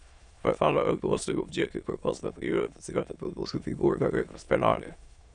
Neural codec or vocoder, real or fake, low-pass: autoencoder, 22.05 kHz, a latent of 192 numbers a frame, VITS, trained on many speakers; fake; 9.9 kHz